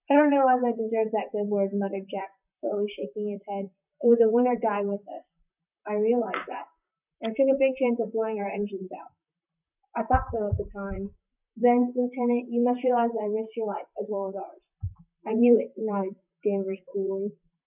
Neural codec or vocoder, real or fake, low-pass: vocoder, 44.1 kHz, 128 mel bands, Pupu-Vocoder; fake; 3.6 kHz